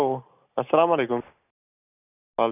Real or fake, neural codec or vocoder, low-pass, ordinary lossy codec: real; none; 3.6 kHz; none